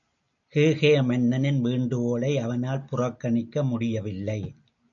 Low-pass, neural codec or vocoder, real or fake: 7.2 kHz; none; real